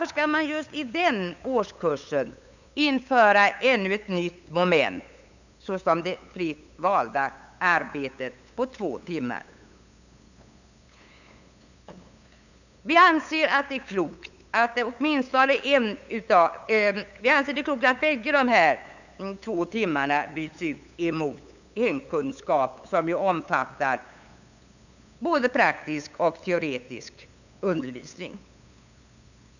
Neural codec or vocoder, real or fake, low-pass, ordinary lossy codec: codec, 16 kHz, 8 kbps, FunCodec, trained on LibriTTS, 25 frames a second; fake; 7.2 kHz; none